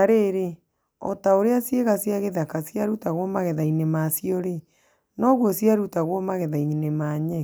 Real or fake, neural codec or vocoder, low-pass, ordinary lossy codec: real; none; none; none